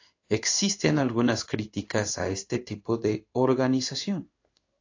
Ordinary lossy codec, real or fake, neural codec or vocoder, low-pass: AAC, 48 kbps; fake; codec, 16 kHz in and 24 kHz out, 1 kbps, XY-Tokenizer; 7.2 kHz